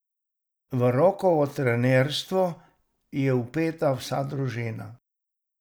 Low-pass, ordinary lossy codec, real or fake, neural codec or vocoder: none; none; real; none